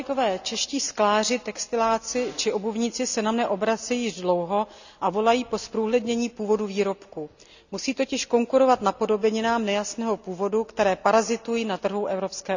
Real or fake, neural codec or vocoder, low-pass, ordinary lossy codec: real; none; 7.2 kHz; none